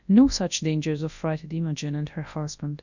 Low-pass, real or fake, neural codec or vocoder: 7.2 kHz; fake; codec, 24 kHz, 0.9 kbps, WavTokenizer, large speech release